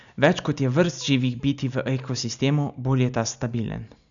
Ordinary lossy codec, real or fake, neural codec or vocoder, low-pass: none; real; none; 7.2 kHz